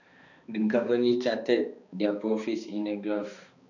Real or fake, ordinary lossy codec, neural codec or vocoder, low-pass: fake; none; codec, 16 kHz, 2 kbps, X-Codec, HuBERT features, trained on balanced general audio; 7.2 kHz